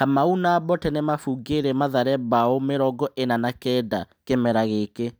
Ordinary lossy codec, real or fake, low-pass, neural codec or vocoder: none; real; none; none